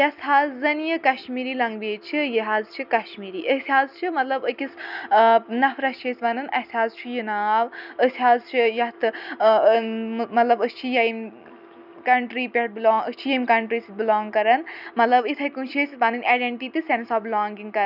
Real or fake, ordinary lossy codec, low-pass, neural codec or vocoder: real; none; 5.4 kHz; none